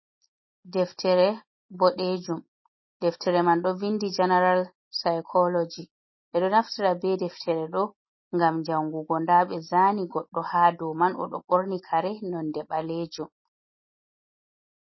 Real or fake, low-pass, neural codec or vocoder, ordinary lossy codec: real; 7.2 kHz; none; MP3, 24 kbps